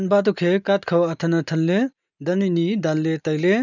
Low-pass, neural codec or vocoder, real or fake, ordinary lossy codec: 7.2 kHz; none; real; none